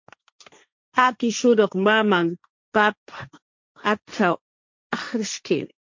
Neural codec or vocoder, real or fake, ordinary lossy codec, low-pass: codec, 16 kHz, 1.1 kbps, Voila-Tokenizer; fake; MP3, 48 kbps; 7.2 kHz